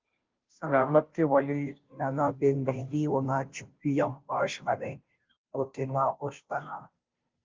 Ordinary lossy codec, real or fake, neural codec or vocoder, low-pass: Opus, 32 kbps; fake; codec, 16 kHz, 0.5 kbps, FunCodec, trained on Chinese and English, 25 frames a second; 7.2 kHz